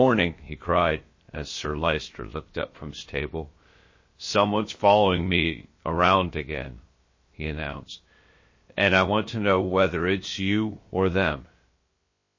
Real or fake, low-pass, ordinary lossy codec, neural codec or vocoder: fake; 7.2 kHz; MP3, 32 kbps; codec, 16 kHz, about 1 kbps, DyCAST, with the encoder's durations